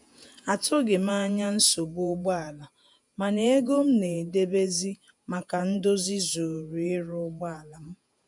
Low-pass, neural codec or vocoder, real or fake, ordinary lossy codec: 10.8 kHz; vocoder, 48 kHz, 128 mel bands, Vocos; fake; none